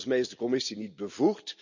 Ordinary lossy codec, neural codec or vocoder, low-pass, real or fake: none; none; 7.2 kHz; real